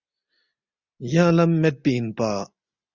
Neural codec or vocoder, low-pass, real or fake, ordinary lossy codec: none; 7.2 kHz; real; Opus, 64 kbps